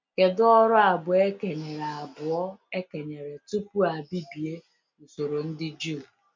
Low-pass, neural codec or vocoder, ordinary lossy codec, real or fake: 7.2 kHz; none; MP3, 64 kbps; real